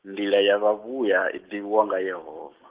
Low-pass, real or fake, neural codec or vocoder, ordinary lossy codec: 3.6 kHz; real; none; Opus, 16 kbps